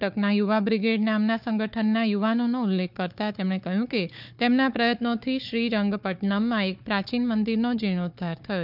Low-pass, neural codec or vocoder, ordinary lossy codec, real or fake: 5.4 kHz; codec, 16 kHz, 4 kbps, FunCodec, trained on Chinese and English, 50 frames a second; none; fake